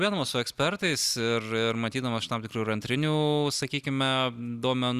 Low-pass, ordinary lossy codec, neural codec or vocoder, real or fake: 14.4 kHz; Opus, 64 kbps; none; real